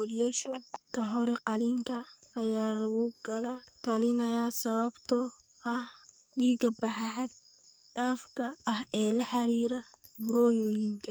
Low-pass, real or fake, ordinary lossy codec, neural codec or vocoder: none; fake; none; codec, 44.1 kHz, 2.6 kbps, SNAC